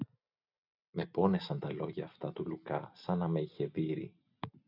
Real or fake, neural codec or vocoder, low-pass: real; none; 5.4 kHz